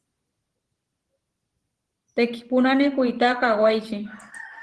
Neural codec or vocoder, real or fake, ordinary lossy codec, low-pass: vocoder, 44.1 kHz, 128 mel bands every 512 samples, BigVGAN v2; fake; Opus, 16 kbps; 10.8 kHz